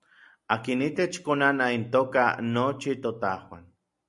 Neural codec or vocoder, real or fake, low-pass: none; real; 10.8 kHz